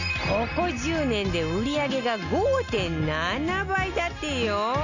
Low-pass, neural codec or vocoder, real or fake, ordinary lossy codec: 7.2 kHz; none; real; none